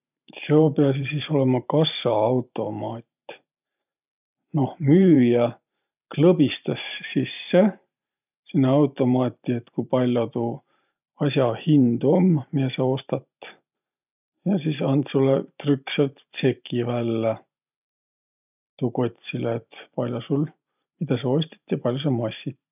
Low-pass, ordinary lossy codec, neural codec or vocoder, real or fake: 3.6 kHz; none; vocoder, 44.1 kHz, 128 mel bands every 512 samples, BigVGAN v2; fake